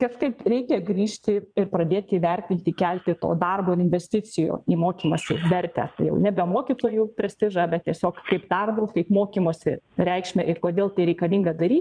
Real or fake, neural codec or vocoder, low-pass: fake; vocoder, 22.05 kHz, 80 mel bands, Vocos; 9.9 kHz